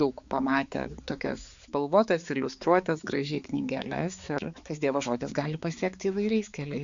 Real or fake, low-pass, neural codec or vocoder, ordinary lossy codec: fake; 7.2 kHz; codec, 16 kHz, 4 kbps, X-Codec, HuBERT features, trained on balanced general audio; Opus, 64 kbps